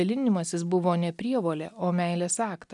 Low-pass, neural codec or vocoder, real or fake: 10.8 kHz; none; real